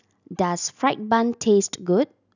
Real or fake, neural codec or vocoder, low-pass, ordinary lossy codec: real; none; 7.2 kHz; none